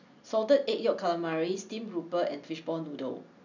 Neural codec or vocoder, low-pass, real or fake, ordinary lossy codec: none; 7.2 kHz; real; none